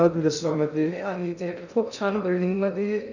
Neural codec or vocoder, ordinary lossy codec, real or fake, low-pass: codec, 16 kHz in and 24 kHz out, 0.6 kbps, FocalCodec, streaming, 2048 codes; none; fake; 7.2 kHz